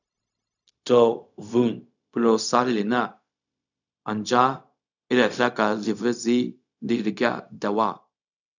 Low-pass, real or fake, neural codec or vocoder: 7.2 kHz; fake; codec, 16 kHz, 0.4 kbps, LongCat-Audio-Codec